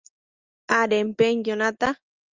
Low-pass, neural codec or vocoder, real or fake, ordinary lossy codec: 7.2 kHz; none; real; Opus, 32 kbps